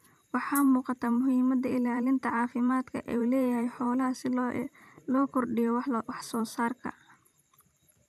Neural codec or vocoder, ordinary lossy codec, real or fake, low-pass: vocoder, 44.1 kHz, 128 mel bands every 512 samples, BigVGAN v2; none; fake; 14.4 kHz